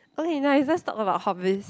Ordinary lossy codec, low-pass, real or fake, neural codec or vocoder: none; none; real; none